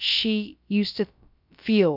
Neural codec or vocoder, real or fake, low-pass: codec, 16 kHz, about 1 kbps, DyCAST, with the encoder's durations; fake; 5.4 kHz